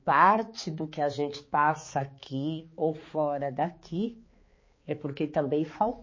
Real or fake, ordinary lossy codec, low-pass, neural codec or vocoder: fake; MP3, 32 kbps; 7.2 kHz; codec, 16 kHz, 4 kbps, X-Codec, HuBERT features, trained on balanced general audio